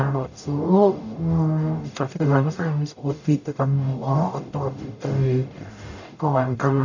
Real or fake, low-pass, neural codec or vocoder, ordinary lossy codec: fake; 7.2 kHz; codec, 44.1 kHz, 0.9 kbps, DAC; none